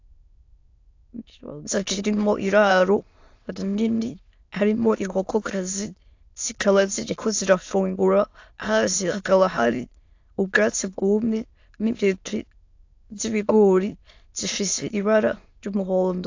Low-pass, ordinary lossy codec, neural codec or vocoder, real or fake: 7.2 kHz; AAC, 48 kbps; autoencoder, 22.05 kHz, a latent of 192 numbers a frame, VITS, trained on many speakers; fake